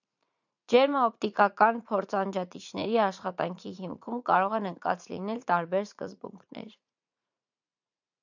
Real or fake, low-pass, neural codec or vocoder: fake; 7.2 kHz; vocoder, 44.1 kHz, 80 mel bands, Vocos